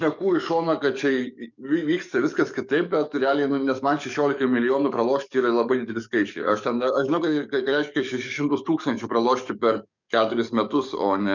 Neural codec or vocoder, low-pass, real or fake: codec, 44.1 kHz, 7.8 kbps, DAC; 7.2 kHz; fake